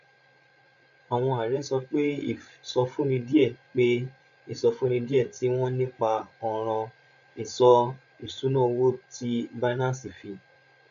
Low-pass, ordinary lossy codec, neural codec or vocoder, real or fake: 7.2 kHz; none; codec, 16 kHz, 16 kbps, FreqCodec, larger model; fake